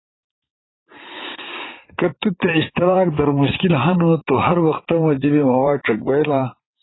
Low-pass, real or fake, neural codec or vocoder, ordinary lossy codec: 7.2 kHz; fake; vocoder, 44.1 kHz, 80 mel bands, Vocos; AAC, 16 kbps